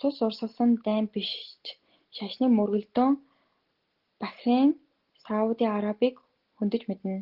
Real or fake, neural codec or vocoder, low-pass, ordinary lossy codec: real; none; 5.4 kHz; Opus, 16 kbps